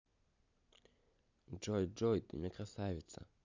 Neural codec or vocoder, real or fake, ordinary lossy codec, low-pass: none; real; MP3, 64 kbps; 7.2 kHz